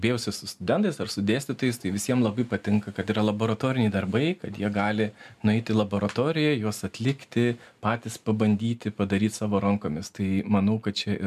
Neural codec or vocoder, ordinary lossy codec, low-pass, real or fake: none; MP3, 96 kbps; 14.4 kHz; real